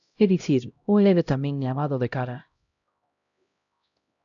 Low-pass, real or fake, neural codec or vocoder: 7.2 kHz; fake; codec, 16 kHz, 0.5 kbps, X-Codec, HuBERT features, trained on LibriSpeech